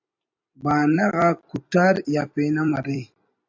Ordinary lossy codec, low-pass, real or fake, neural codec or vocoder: AAC, 48 kbps; 7.2 kHz; fake; vocoder, 44.1 kHz, 128 mel bands every 256 samples, BigVGAN v2